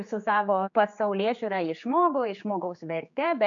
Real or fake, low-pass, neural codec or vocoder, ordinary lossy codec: fake; 7.2 kHz; codec, 16 kHz, 4 kbps, X-Codec, HuBERT features, trained on general audio; MP3, 64 kbps